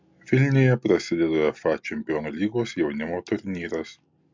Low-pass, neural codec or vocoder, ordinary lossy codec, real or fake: 7.2 kHz; none; MP3, 64 kbps; real